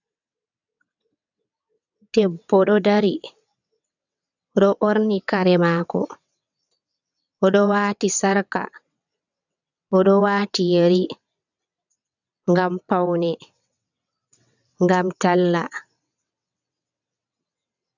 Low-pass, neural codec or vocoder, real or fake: 7.2 kHz; vocoder, 22.05 kHz, 80 mel bands, WaveNeXt; fake